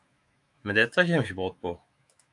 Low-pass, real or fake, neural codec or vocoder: 10.8 kHz; fake; autoencoder, 48 kHz, 128 numbers a frame, DAC-VAE, trained on Japanese speech